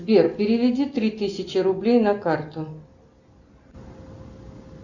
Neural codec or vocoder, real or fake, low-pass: none; real; 7.2 kHz